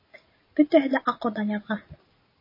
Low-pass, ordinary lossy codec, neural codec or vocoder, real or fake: 5.4 kHz; MP3, 24 kbps; none; real